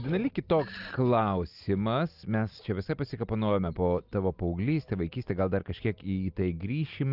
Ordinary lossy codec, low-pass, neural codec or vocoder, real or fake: Opus, 24 kbps; 5.4 kHz; none; real